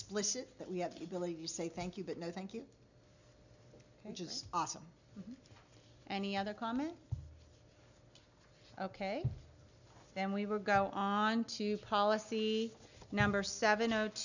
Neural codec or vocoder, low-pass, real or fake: none; 7.2 kHz; real